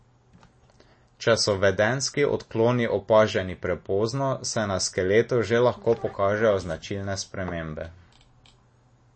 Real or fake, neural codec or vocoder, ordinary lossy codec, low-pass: real; none; MP3, 32 kbps; 9.9 kHz